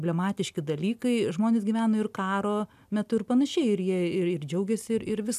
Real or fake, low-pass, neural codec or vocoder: real; 14.4 kHz; none